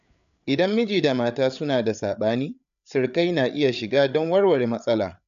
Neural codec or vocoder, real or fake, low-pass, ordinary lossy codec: codec, 16 kHz, 8 kbps, FreqCodec, larger model; fake; 7.2 kHz; none